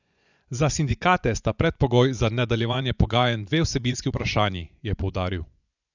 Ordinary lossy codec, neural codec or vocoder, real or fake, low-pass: none; vocoder, 24 kHz, 100 mel bands, Vocos; fake; 7.2 kHz